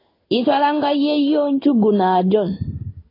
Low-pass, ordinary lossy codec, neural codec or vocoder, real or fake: 5.4 kHz; AAC, 24 kbps; codec, 16 kHz in and 24 kHz out, 1 kbps, XY-Tokenizer; fake